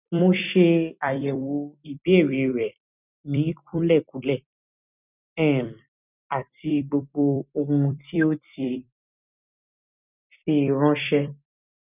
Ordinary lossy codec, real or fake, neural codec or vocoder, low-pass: none; fake; vocoder, 44.1 kHz, 128 mel bands every 256 samples, BigVGAN v2; 3.6 kHz